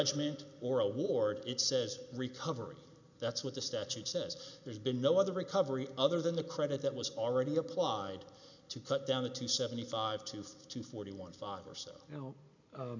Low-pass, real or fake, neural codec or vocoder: 7.2 kHz; real; none